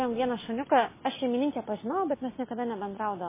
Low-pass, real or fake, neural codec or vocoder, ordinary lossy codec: 3.6 kHz; real; none; MP3, 16 kbps